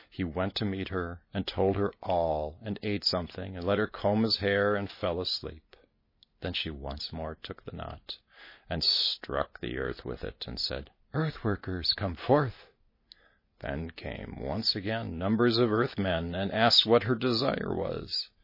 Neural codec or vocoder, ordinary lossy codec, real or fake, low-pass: none; MP3, 24 kbps; real; 5.4 kHz